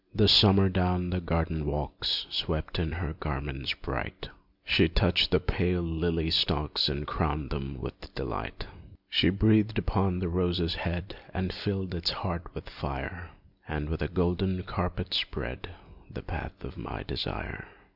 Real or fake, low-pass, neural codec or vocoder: real; 5.4 kHz; none